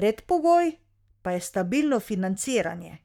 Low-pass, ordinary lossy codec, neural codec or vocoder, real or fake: 19.8 kHz; none; codec, 44.1 kHz, 7.8 kbps, Pupu-Codec; fake